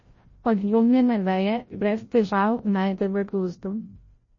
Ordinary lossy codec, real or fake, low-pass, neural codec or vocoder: MP3, 32 kbps; fake; 7.2 kHz; codec, 16 kHz, 0.5 kbps, FreqCodec, larger model